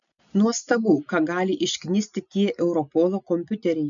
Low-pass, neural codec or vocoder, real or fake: 7.2 kHz; none; real